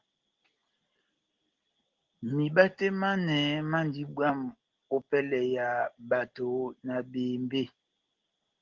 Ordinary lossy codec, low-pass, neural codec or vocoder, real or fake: Opus, 16 kbps; 7.2 kHz; none; real